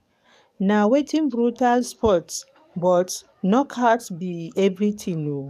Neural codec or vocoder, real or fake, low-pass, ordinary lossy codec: codec, 44.1 kHz, 7.8 kbps, DAC; fake; 14.4 kHz; none